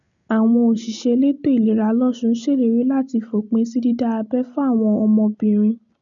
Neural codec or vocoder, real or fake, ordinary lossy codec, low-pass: none; real; none; 7.2 kHz